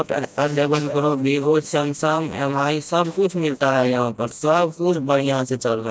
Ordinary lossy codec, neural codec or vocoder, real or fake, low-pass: none; codec, 16 kHz, 1 kbps, FreqCodec, smaller model; fake; none